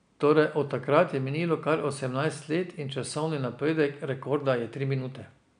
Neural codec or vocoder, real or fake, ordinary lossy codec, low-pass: none; real; none; 9.9 kHz